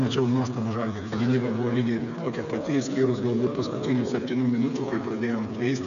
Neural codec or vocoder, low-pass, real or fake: codec, 16 kHz, 4 kbps, FreqCodec, smaller model; 7.2 kHz; fake